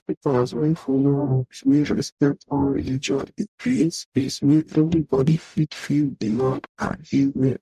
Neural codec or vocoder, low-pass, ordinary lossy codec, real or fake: codec, 44.1 kHz, 0.9 kbps, DAC; 14.4 kHz; none; fake